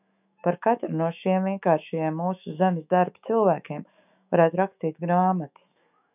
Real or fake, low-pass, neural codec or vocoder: fake; 3.6 kHz; autoencoder, 48 kHz, 128 numbers a frame, DAC-VAE, trained on Japanese speech